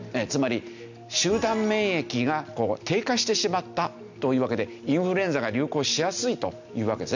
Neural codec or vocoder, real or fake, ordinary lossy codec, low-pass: none; real; none; 7.2 kHz